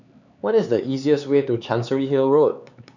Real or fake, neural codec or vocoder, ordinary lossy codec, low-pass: fake; codec, 16 kHz, 4 kbps, X-Codec, HuBERT features, trained on LibriSpeech; none; 7.2 kHz